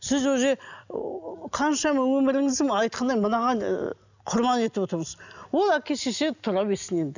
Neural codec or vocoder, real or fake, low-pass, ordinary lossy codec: none; real; 7.2 kHz; none